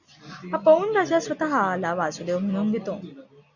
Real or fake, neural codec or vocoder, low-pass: real; none; 7.2 kHz